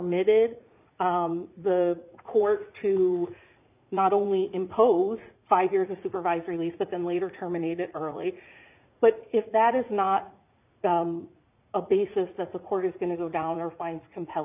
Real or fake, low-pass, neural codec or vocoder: fake; 3.6 kHz; vocoder, 44.1 kHz, 80 mel bands, Vocos